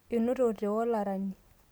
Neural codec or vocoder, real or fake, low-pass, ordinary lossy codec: none; real; none; none